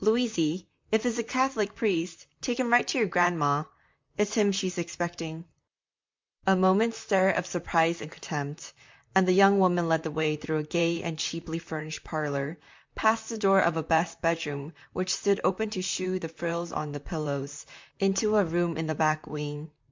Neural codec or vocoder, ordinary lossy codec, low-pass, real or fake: vocoder, 44.1 kHz, 128 mel bands, Pupu-Vocoder; MP3, 64 kbps; 7.2 kHz; fake